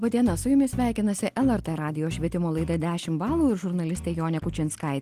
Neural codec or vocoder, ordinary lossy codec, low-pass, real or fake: none; Opus, 24 kbps; 14.4 kHz; real